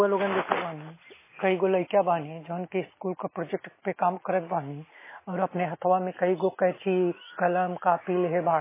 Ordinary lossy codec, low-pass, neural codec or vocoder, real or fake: MP3, 16 kbps; 3.6 kHz; none; real